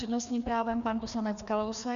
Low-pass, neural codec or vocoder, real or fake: 7.2 kHz; codec, 16 kHz, 2 kbps, FreqCodec, larger model; fake